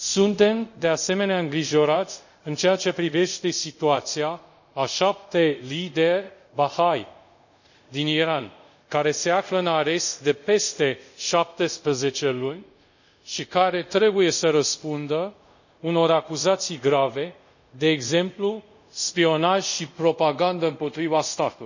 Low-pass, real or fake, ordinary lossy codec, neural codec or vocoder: 7.2 kHz; fake; none; codec, 24 kHz, 0.5 kbps, DualCodec